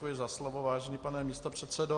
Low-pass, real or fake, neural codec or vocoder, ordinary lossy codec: 10.8 kHz; real; none; Opus, 32 kbps